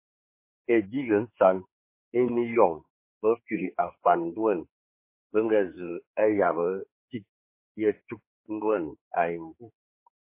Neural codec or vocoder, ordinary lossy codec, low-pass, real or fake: codec, 16 kHz, 4 kbps, X-Codec, HuBERT features, trained on general audio; MP3, 24 kbps; 3.6 kHz; fake